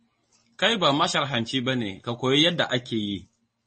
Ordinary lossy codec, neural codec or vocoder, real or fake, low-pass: MP3, 32 kbps; none; real; 10.8 kHz